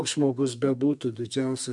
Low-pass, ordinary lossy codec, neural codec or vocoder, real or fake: 10.8 kHz; MP3, 64 kbps; codec, 32 kHz, 1.9 kbps, SNAC; fake